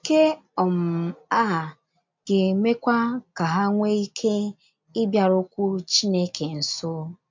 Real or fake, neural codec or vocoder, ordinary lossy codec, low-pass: real; none; MP3, 64 kbps; 7.2 kHz